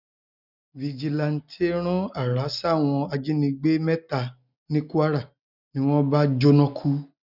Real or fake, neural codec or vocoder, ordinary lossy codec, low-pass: real; none; none; 5.4 kHz